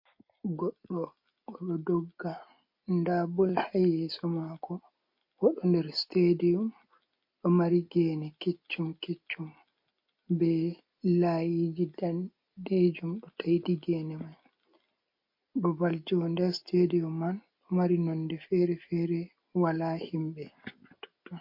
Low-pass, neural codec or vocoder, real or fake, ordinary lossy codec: 5.4 kHz; none; real; MP3, 32 kbps